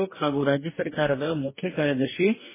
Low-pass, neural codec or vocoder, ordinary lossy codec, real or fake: 3.6 kHz; codec, 44.1 kHz, 2.6 kbps, DAC; MP3, 16 kbps; fake